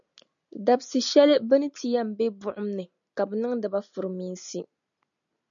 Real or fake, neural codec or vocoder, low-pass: real; none; 7.2 kHz